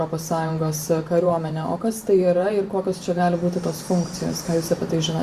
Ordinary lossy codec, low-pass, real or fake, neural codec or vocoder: Opus, 64 kbps; 14.4 kHz; fake; vocoder, 44.1 kHz, 128 mel bands every 512 samples, BigVGAN v2